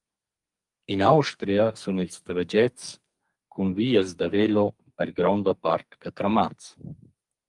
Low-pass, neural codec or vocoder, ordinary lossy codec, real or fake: 10.8 kHz; codec, 44.1 kHz, 2.6 kbps, SNAC; Opus, 32 kbps; fake